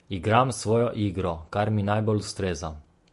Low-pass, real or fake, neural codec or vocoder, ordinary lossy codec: 14.4 kHz; fake; vocoder, 48 kHz, 128 mel bands, Vocos; MP3, 48 kbps